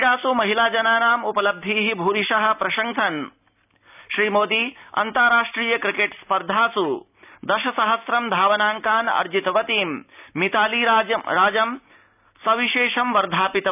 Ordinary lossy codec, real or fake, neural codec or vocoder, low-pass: none; real; none; 3.6 kHz